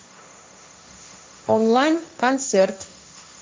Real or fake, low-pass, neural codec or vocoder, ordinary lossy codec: fake; none; codec, 16 kHz, 1.1 kbps, Voila-Tokenizer; none